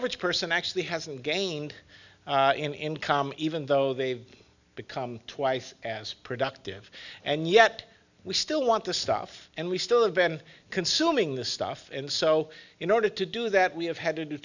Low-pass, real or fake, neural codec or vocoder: 7.2 kHz; real; none